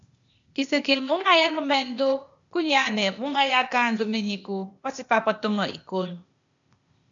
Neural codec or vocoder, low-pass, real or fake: codec, 16 kHz, 0.8 kbps, ZipCodec; 7.2 kHz; fake